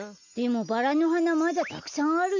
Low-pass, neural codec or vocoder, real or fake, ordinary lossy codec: 7.2 kHz; none; real; none